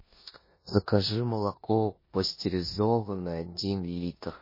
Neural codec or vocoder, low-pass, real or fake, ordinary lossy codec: codec, 16 kHz in and 24 kHz out, 0.9 kbps, LongCat-Audio-Codec, four codebook decoder; 5.4 kHz; fake; MP3, 24 kbps